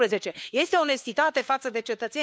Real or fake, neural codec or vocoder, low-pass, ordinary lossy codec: fake; codec, 16 kHz, 4 kbps, FunCodec, trained on LibriTTS, 50 frames a second; none; none